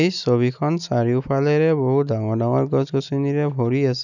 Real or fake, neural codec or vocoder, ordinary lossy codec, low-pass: real; none; none; 7.2 kHz